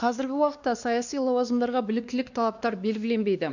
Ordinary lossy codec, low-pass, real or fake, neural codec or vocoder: none; 7.2 kHz; fake; codec, 16 kHz, 2 kbps, X-Codec, WavLM features, trained on Multilingual LibriSpeech